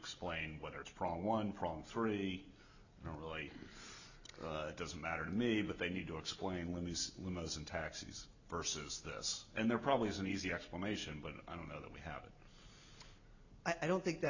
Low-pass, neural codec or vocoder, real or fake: 7.2 kHz; none; real